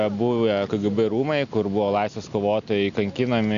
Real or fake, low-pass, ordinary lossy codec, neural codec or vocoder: real; 7.2 kHz; MP3, 96 kbps; none